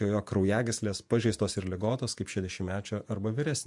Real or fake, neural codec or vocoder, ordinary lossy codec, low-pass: fake; vocoder, 48 kHz, 128 mel bands, Vocos; MP3, 64 kbps; 10.8 kHz